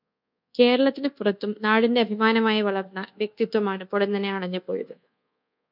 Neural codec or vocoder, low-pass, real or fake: codec, 24 kHz, 1.2 kbps, DualCodec; 5.4 kHz; fake